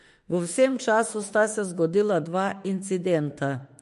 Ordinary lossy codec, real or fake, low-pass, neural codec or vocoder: MP3, 48 kbps; fake; 14.4 kHz; autoencoder, 48 kHz, 32 numbers a frame, DAC-VAE, trained on Japanese speech